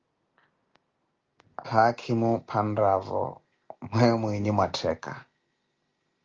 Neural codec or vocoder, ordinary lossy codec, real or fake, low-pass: none; Opus, 24 kbps; real; 7.2 kHz